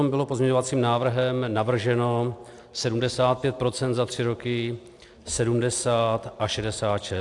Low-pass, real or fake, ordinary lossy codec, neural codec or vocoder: 10.8 kHz; real; AAC, 64 kbps; none